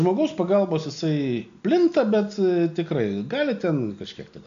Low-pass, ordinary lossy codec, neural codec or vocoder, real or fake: 7.2 kHz; MP3, 64 kbps; none; real